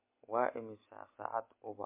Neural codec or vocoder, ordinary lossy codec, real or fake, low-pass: none; MP3, 24 kbps; real; 3.6 kHz